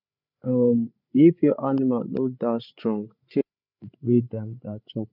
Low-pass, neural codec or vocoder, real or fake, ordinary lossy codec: 5.4 kHz; codec, 16 kHz, 8 kbps, FreqCodec, larger model; fake; none